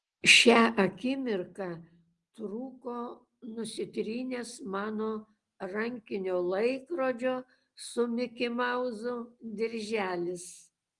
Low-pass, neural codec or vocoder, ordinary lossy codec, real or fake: 10.8 kHz; none; Opus, 24 kbps; real